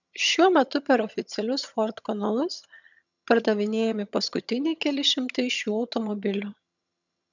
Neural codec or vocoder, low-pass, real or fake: vocoder, 22.05 kHz, 80 mel bands, HiFi-GAN; 7.2 kHz; fake